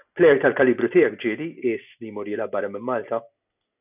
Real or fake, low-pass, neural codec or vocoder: real; 3.6 kHz; none